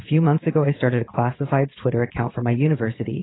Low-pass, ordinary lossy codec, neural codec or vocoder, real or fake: 7.2 kHz; AAC, 16 kbps; vocoder, 22.05 kHz, 80 mel bands, WaveNeXt; fake